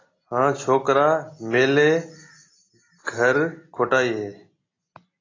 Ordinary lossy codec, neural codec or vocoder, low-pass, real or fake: AAC, 32 kbps; none; 7.2 kHz; real